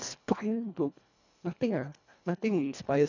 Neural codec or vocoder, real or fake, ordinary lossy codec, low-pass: codec, 24 kHz, 1.5 kbps, HILCodec; fake; none; 7.2 kHz